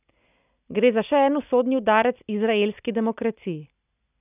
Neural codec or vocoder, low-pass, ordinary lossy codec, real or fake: none; 3.6 kHz; none; real